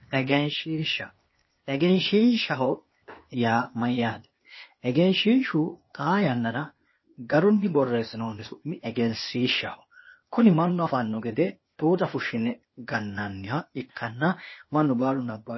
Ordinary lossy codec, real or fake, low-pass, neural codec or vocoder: MP3, 24 kbps; fake; 7.2 kHz; codec, 16 kHz, 0.8 kbps, ZipCodec